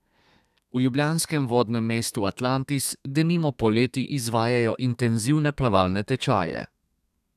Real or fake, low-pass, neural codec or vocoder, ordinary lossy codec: fake; 14.4 kHz; codec, 32 kHz, 1.9 kbps, SNAC; none